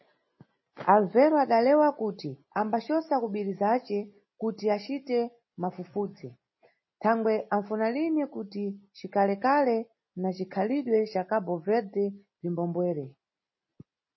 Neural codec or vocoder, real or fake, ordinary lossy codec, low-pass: none; real; MP3, 24 kbps; 7.2 kHz